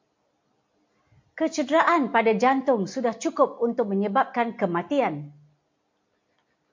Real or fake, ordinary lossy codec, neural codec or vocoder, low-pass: real; MP3, 48 kbps; none; 7.2 kHz